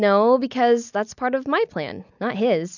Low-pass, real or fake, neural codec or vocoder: 7.2 kHz; real; none